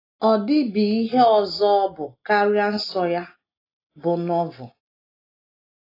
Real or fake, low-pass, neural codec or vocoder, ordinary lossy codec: real; 5.4 kHz; none; AAC, 24 kbps